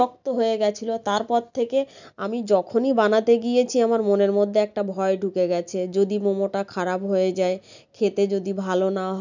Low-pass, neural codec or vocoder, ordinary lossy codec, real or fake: 7.2 kHz; none; none; real